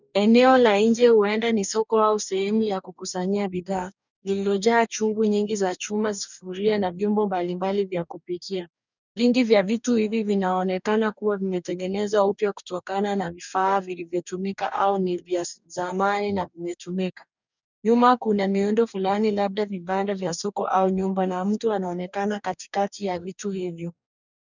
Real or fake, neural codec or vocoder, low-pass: fake; codec, 44.1 kHz, 2.6 kbps, DAC; 7.2 kHz